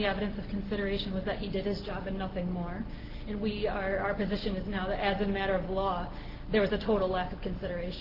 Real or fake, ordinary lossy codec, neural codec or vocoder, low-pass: real; Opus, 16 kbps; none; 5.4 kHz